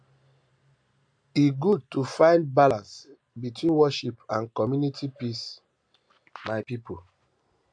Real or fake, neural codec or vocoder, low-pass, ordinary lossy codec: real; none; 9.9 kHz; AAC, 64 kbps